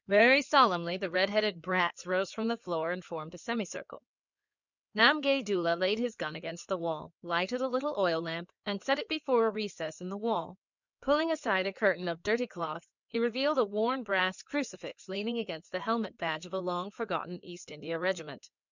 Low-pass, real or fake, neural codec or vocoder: 7.2 kHz; fake; codec, 16 kHz in and 24 kHz out, 2.2 kbps, FireRedTTS-2 codec